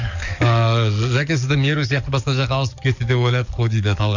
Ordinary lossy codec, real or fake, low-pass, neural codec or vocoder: none; fake; 7.2 kHz; codec, 44.1 kHz, 7.8 kbps, DAC